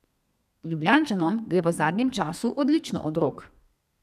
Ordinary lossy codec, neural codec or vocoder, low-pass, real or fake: none; codec, 32 kHz, 1.9 kbps, SNAC; 14.4 kHz; fake